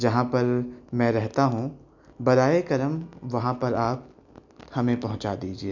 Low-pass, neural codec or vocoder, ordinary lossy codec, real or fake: 7.2 kHz; none; none; real